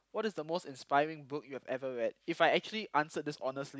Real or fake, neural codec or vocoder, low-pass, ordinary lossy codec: real; none; none; none